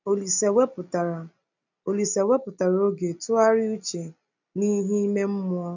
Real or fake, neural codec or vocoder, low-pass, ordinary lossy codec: real; none; 7.2 kHz; none